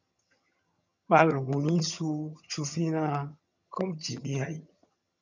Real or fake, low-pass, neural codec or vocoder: fake; 7.2 kHz; vocoder, 22.05 kHz, 80 mel bands, HiFi-GAN